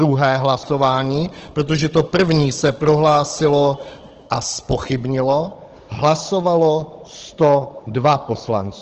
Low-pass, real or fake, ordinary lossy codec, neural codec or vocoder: 7.2 kHz; fake; Opus, 16 kbps; codec, 16 kHz, 16 kbps, FunCodec, trained on Chinese and English, 50 frames a second